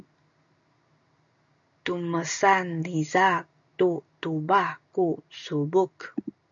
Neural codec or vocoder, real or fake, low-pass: none; real; 7.2 kHz